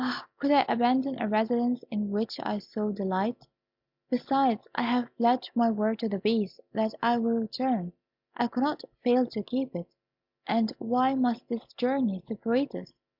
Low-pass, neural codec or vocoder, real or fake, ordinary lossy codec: 5.4 kHz; none; real; MP3, 48 kbps